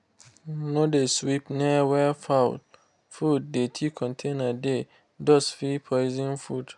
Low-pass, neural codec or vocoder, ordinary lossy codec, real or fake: 10.8 kHz; none; none; real